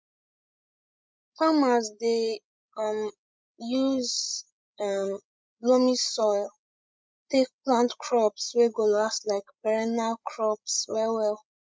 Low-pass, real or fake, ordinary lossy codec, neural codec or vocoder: none; fake; none; codec, 16 kHz, 16 kbps, FreqCodec, larger model